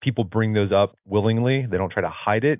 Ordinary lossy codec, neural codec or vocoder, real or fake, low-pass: AAC, 32 kbps; none; real; 3.6 kHz